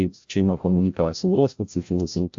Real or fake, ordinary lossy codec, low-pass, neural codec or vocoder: fake; AAC, 64 kbps; 7.2 kHz; codec, 16 kHz, 0.5 kbps, FreqCodec, larger model